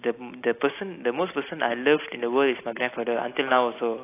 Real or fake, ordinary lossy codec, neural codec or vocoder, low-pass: real; AAC, 24 kbps; none; 3.6 kHz